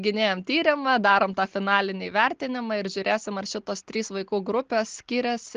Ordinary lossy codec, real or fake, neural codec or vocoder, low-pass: Opus, 16 kbps; real; none; 7.2 kHz